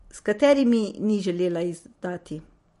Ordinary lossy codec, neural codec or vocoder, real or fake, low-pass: MP3, 48 kbps; vocoder, 44.1 kHz, 128 mel bands every 256 samples, BigVGAN v2; fake; 14.4 kHz